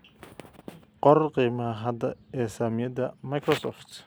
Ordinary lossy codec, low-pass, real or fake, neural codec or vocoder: none; none; real; none